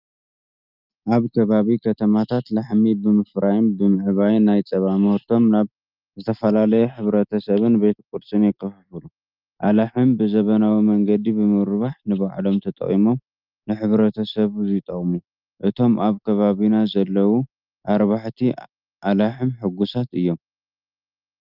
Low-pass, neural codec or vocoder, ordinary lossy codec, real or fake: 5.4 kHz; none; Opus, 32 kbps; real